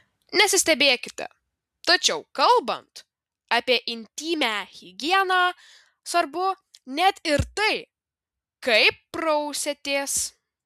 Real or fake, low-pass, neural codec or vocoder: real; 14.4 kHz; none